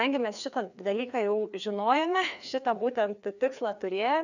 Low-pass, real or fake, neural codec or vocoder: 7.2 kHz; fake; codec, 16 kHz, 2 kbps, FreqCodec, larger model